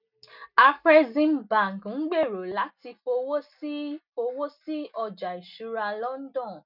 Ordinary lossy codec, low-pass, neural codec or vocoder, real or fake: none; 5.4 kHz; none; real